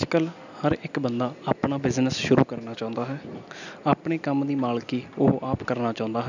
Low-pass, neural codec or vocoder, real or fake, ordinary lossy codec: 7.2 kHz; none; real; none